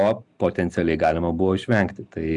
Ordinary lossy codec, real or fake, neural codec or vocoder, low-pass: MP3, 96 kbps; real; none; 10.8 kHz